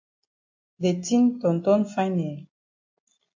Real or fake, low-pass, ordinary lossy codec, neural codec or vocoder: real; 7.2 kHz; MP3, 48 kbps; none